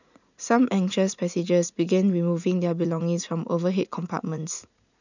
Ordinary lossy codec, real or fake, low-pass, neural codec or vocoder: none; fake; 7.2 kHz; vocoder, 44.1 kHz, 80 mel bands, Vocos